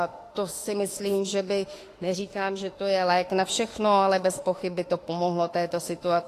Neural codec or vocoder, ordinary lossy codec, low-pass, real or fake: autoencoder, 48 kHz, 32 numbers a frame, DAC-VAE, trained on Japanese speech; AAC, 48 kbps; 14.4 kHz; fake